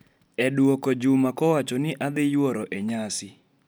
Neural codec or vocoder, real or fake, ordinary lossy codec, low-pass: none; real; none; none